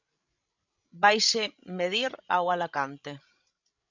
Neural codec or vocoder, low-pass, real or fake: codec, 16 kHz, 16 kbps, FreqCodec, larger model; 7.2 kHz; fake